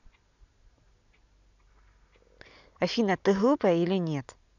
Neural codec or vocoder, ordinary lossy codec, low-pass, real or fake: none; none; 7.2 kHz; real